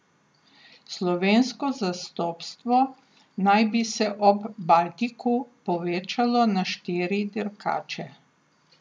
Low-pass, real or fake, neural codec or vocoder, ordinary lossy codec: none; real; none; none